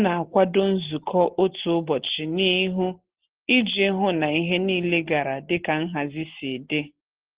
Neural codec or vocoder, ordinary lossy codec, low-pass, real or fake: none; Opus, 16 kbps; 3.6 kHz; real